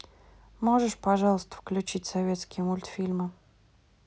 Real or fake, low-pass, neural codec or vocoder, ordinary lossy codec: real; none; none; none